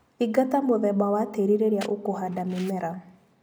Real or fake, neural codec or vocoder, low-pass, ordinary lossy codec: real; none; none; none